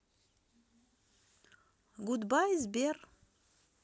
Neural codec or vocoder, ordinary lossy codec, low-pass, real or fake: none; none; none; real